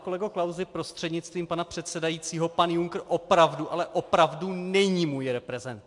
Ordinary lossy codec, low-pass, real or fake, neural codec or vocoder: MP3, 64 kbps; 10.8 kHz; real; none